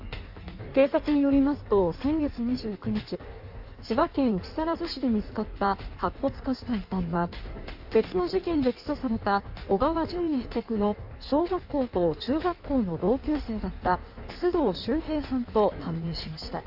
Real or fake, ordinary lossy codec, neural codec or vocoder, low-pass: fake; AAC, 48 kbps; codec, 16 kHz in and 24 kHz out, 1.1 kbps, FireRedTTS-2 codec; 5.4 kHz